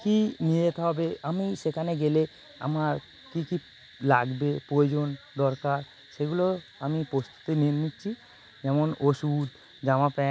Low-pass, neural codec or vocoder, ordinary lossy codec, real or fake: none; none; none; real